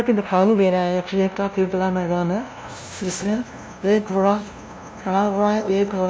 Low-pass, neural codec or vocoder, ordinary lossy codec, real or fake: none; codec, 16 kHz, 0.5 kbps, FunCodec, trained on LibriTTS, 25 frames a second; none; fake